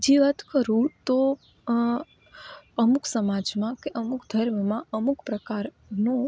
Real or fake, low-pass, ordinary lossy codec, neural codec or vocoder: real; none; none; none